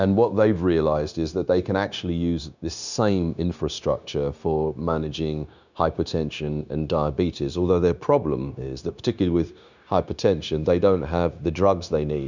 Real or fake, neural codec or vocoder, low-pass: fake; codec, 16 kHz, 0.9 kbps, LongCat-Audio-Codec; 7.2 kHz